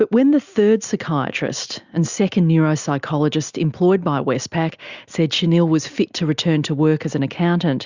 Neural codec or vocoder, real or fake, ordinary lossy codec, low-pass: none; real; Opus, 64 kbps; 7.2 kHz